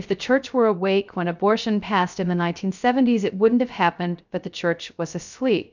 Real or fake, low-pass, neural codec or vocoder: fake; 7.2 kHz; codec, 16 kHz, 0.3 kbps, FocalCodec